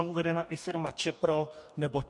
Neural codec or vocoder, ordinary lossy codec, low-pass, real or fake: codec, 44.1 kHz, 2.6 kbps, DAC; MP3, 64 kbps; 10.8 kHz; fake